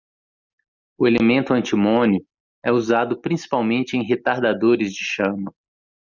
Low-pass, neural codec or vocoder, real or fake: 7.2 kHz; none; real